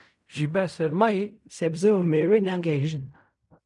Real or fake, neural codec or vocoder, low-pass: fake; codec, 16 kHz in and 24 kHz out, 0.4 kbps, LongCat-Audio-Codec, fine tuned four codebook decoder; 10.8 kHz